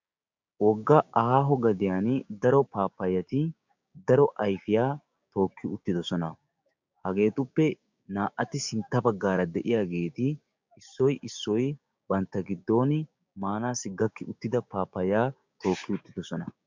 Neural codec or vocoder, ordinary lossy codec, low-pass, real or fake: codec, 16 kHz, 6 kbps, DAC; MP3, 64 kbps; 7.2 kHz; fake